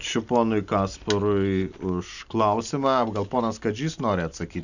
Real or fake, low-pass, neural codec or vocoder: real; 7.2 kHz; none